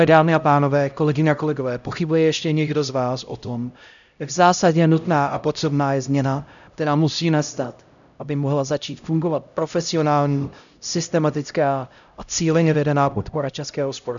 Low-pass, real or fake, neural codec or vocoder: 7.2 kHz; fake; codec, 16 kHz, 0.5 kbps, X-Codec, HuBERT features, trained on LibriSpeech